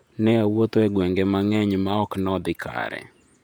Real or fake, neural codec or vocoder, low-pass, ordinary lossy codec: fake; vocoder, 48 kHz, 128 mel bands, Vocos; 19.8 kHz; none